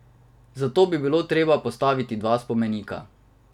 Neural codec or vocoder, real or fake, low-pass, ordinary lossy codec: none; real; 19.8 kHz; none